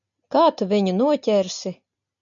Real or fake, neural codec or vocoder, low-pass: real; none; 7.2 kHz